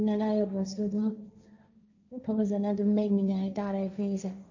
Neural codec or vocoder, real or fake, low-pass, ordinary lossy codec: codec, 16 kHz, 1.1 kbps, Voila-Tokenizer; fake; 7.2 kHz; none